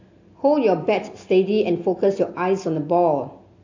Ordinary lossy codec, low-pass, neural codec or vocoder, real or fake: AAC, 48 kbps; 7.2 kHz; none; real